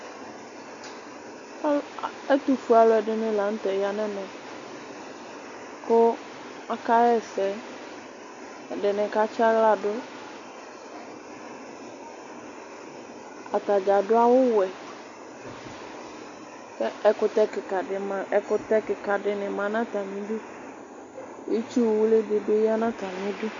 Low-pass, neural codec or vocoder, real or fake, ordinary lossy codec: 7.2 kHz; none; real; AAC, 48 kbps